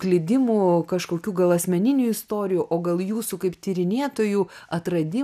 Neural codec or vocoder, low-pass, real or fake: none; 14.4 kHz; real